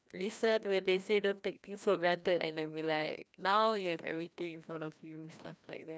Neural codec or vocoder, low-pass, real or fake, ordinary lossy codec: codec, 16 kHz, 1 kbps, FreqCodec, larger model; none; fake; none